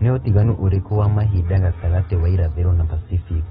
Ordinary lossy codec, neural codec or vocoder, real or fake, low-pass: AAC, 16 kbps; none; real; 19.8 kHz